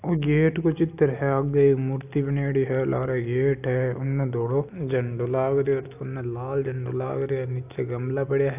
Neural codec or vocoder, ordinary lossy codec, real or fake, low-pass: none; AAC, 32 kbps; real; 3.6 kHz